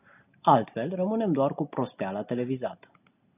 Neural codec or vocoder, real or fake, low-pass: none; real; 3.6 kHz